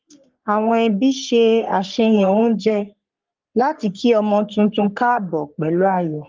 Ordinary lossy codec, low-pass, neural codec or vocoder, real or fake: Opus, 32 kbps; 7.2 kHz; codec, 44.1 kHz, 3.4 kbps, Pupu-Codec; fake